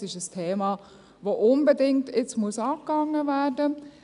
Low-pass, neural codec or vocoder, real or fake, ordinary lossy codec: 10.8 kHz; none; real; none